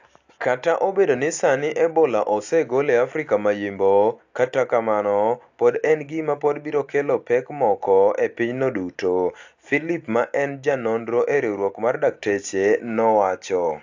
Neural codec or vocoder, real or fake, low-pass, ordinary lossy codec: none; real; 7.2 kHz; AAC, 48 kbps